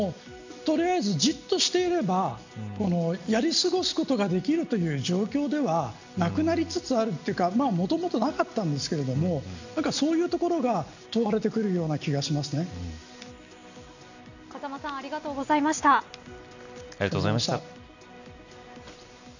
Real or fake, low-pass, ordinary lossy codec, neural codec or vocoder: real; 7.2 kHz; none; none